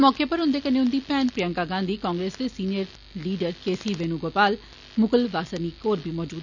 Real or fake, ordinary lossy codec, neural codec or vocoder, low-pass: real; none; none; 7.2 kHz